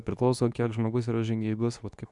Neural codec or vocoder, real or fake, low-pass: codec, 24 kHz, 0.9 kbps, WavTokenizer, medium speech release version 2; fake; 10.8 kHz